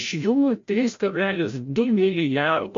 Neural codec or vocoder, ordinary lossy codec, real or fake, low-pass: codec, 16 kHz, 0.5 kbps, FreqCodec, larger model; MP3, 48 kbps; fake; 7.2 kHz